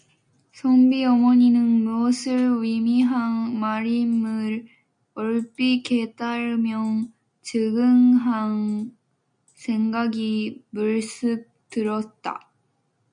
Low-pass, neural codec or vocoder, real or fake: 9.9 kHz; none; real